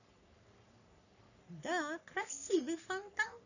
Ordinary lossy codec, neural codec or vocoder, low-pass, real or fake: none; codec, 44.1 kHz, 3.4 kbps, Pupu-Codec; 7.2 kHz; fake